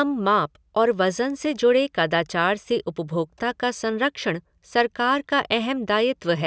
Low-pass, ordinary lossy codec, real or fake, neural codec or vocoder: none; none; real; none